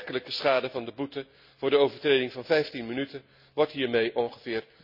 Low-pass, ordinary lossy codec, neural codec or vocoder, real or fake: 5.4 kHz; none; none; real